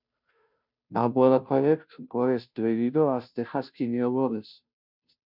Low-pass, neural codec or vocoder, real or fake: 5.4 kHz; codec, 16 kHz, 0.5 kbps, FunCodec, trained on Chinese and English, 25 frames a second; fake